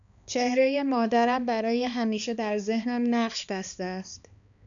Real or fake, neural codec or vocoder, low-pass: fake; codec, 16 kHz, 2 kbps, X-Codec, HuBERT features, trained on balanced general audio; 7.2 kHz